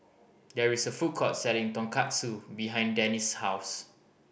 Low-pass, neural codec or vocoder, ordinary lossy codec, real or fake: none; none; none; real